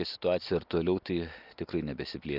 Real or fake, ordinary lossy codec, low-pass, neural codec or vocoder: real; Opus, 24 kbps; 5.4 kHz; none